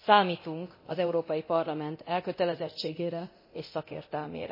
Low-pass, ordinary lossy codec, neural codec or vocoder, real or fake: 5.4 kHz; MP3, 24 kbps; codec, 24 kHz, 0.9 kbps, DualCodec; fake